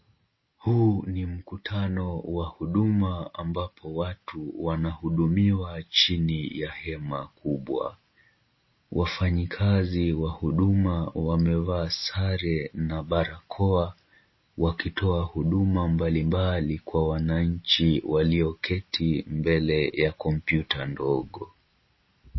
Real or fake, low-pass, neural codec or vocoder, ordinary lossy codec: real; 7.2 kHz; none; MP3, 24 kbps